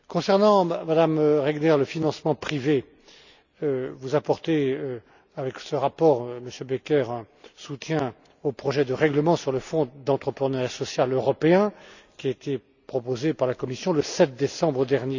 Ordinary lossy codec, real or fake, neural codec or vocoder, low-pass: none; real; none; 7.2 kHz